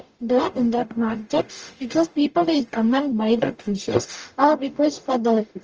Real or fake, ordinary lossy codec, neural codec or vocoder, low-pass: fake; Opus, 24 kbps; codec, 44.1 kHz, 0.9 kbps, DAC; 7.2 kHz